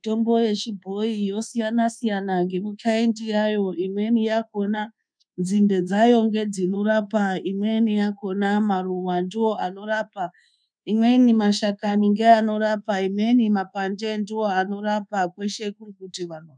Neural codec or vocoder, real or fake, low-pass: codec, 24 kHz, 1.2 kbps, DualCodec; fake; 9.9 kHz